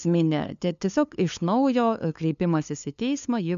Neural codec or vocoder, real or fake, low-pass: codec, 16 kHz, 2 kbps, FunCodec, trained on LibriTTS, 25 frames a second; fake; 7.2 kHz